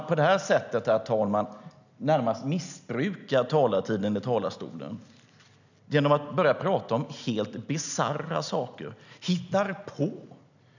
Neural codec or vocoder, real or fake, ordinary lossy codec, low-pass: none; real; none; 7.2 kHz